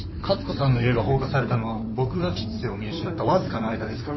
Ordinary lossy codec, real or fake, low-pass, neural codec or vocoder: MP3, 24 kbps; fake; 7.2 kHz; codec, 16 kHz in and 24 kHz out, 2.2 kbps, FireRedTTS-2 codec